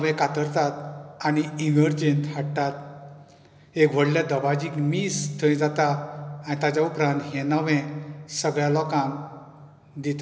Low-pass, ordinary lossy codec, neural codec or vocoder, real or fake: none; none; none; real